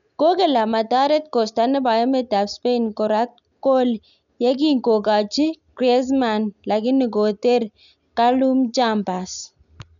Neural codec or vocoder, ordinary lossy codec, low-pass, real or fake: none; none; 7.2 kHz; real